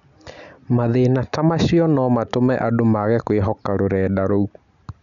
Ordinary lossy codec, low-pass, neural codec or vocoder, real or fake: none; 7.2 kHz; none; real